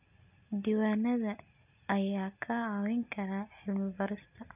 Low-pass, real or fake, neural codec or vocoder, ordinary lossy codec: 3.6 kHz; real; none; none